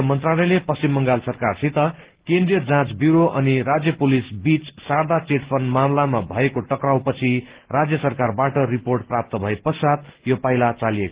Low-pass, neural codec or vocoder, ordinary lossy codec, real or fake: 3.6 kHz; none; Opus, 16 kbps; real